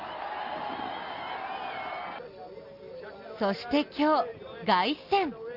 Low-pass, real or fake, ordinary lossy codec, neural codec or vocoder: 5.4 kHz; real; Opus, 32 kbps; none